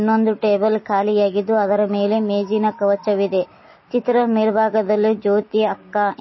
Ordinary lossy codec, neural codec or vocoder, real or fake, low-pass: MP3, 24 kbps; none; real; 7.2 kHz